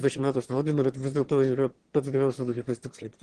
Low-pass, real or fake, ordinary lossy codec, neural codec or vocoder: 9.9 kHz; fake; Opus, 16 kbps; autoencoder, 22.05 kHz, a latent of 192 numbers a frame, VITS, trained on one speaker